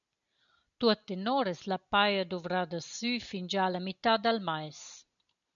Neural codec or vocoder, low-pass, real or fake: none; 7.2 kHz; real